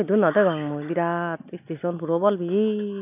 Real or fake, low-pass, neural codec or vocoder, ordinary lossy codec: real; 3.6 kHz; none; none